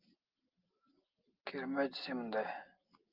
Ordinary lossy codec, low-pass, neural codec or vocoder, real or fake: Opus, 32 kbps; 5.4 kHz; none; real